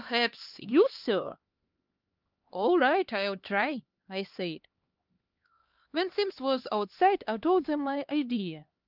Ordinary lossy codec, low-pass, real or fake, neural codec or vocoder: Opus, 24 kbps; 5.4 kHz; fake; codec, 16 kHz, 2 kbps, X-Codec, HuBERT features, trained on LibriSpeech